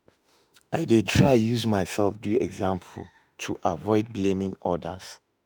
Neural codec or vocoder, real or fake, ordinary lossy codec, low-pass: autoencoder, 48 kHz, 32 numbers a frame, DAC-VAE, trained on Japanese speech; fake; none; none